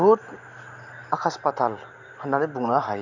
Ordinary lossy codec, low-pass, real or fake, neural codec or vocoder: none; 7.2 kHz; real; none